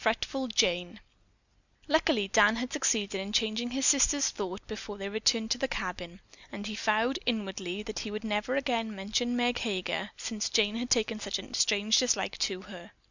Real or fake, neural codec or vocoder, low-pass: real; none; 7.2 kHz